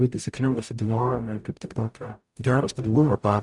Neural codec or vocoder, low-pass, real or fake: codec, 44.1 kHz, 0.9 kbps, DAC; 10.8 kHz; fake